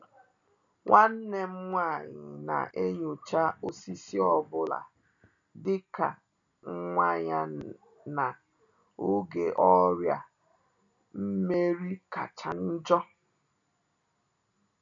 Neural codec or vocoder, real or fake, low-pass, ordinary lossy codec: none; real; 7.2 kHz; none